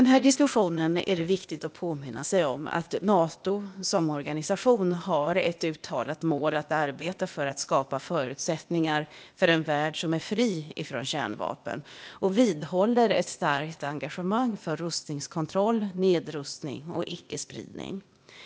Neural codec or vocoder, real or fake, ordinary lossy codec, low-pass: codec, 16 kHz, 0.8 kbps, ZipCodec; fake; none; none